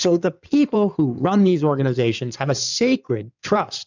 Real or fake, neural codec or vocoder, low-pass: fake; codec, 16 kHz in and 24 kHz out, 2.2 kbps, FireRedTTS-2 codec; 7.2 kHz